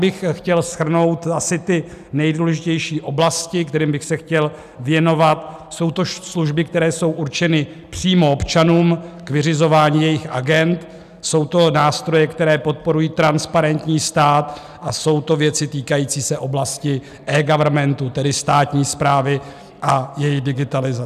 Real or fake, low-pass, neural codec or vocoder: real; 14.4 kHz; none